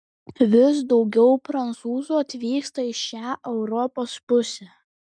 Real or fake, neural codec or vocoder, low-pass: real; none; 9.9 kHz